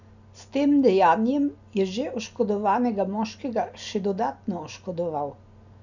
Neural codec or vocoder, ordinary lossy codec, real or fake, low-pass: none; none; real; 7.2 kHz